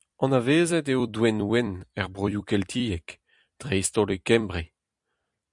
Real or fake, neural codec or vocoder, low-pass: fake; vocoder, 44.1 kHz, 128 mel bands every 256 samples, BigVGAN v2; 10.8 kHz